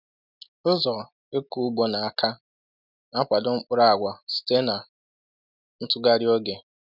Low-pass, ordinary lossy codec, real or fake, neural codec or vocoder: 5.4 kHz; none; real; none